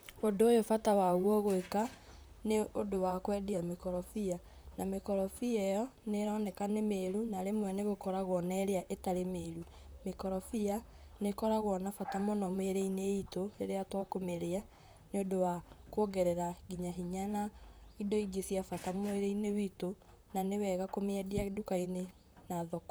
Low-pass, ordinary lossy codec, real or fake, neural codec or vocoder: none; none; fake; vocoder, 44.1 kHz, 128 mel bands, Pupu-Vocoder